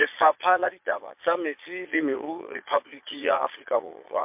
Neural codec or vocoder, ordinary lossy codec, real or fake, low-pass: vocoder, 22.05 kHz, 80 mel bands, Vocos; MP3, 32 kbps; fake; 3.6 kHz